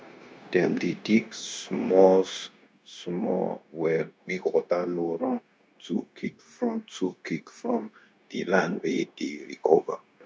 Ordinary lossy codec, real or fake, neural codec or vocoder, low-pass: none; fake; codec, 16 kHz, 0.9 kbps, LongCat-Audio-Codec; none